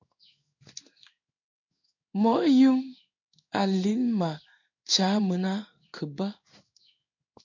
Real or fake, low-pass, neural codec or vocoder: fake; 7.2 kHz; codec, 16 kHz in and 24 kHz out, 1 kbps, XY-Tokenizer